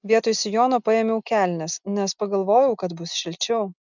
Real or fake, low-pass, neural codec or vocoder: real; 7.2 kHz; none